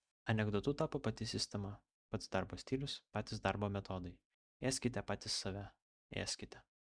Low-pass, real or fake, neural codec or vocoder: 9.9 kHz; real; none